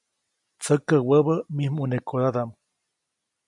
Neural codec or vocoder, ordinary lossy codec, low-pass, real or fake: none; MP3, 64 kbps; 10.8 kHz; real